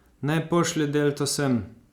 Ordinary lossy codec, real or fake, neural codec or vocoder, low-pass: Opus, 64 kbps; real; none; 19.8 kHz